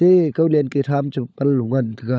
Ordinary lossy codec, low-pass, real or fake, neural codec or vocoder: none; none; fake; codec, 16 kHz, 16 kbps, FunCodec, trained on LibriTTS, 50 frames a second